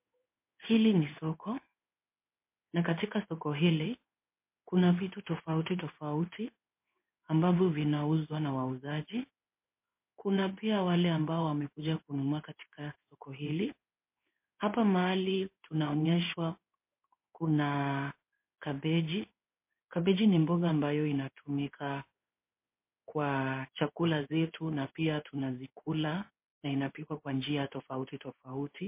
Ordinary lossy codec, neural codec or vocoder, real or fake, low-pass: MP3, 24 kbps; codec, 16 kHz in and 24 kHz out, 1 kbps, XY-Tokenizer; fake; 3.6 kHz